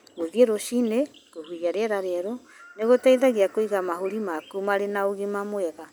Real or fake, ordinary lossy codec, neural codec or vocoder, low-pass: real; none; none; none